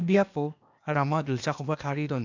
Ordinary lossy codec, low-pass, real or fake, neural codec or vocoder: MP3, 64 kbps; 7.2 kHz; fake; codec, 16 kHz, 0.8 kbps, ZipCodec